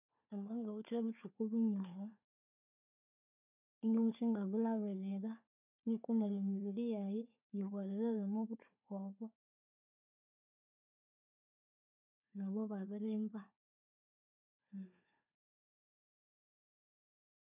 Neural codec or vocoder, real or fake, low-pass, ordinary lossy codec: codec, 16 kHz, 4 kbps, FunCodec, trained on Chinese and English, 50 frames a second; fake; 3.6 kHz; none